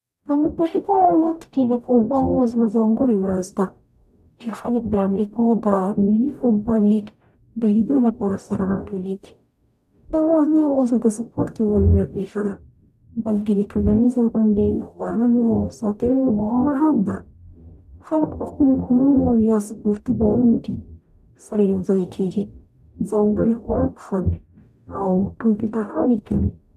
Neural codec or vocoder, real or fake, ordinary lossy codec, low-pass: codec, 44.1 kHz, 0.9 kbps, DAC; fake; none; 14.4 kHz